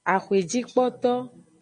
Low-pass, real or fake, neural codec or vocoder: 9.9 kHz; real; none